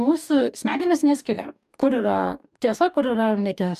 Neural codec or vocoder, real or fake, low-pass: codec, 44.1 kHz, 2.6 kbps, DAC; fake; 14.4 kHz